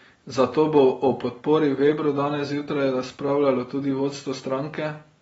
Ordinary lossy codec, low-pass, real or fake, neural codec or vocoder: AAC, 24 kbps; 19.8 kHz; real; none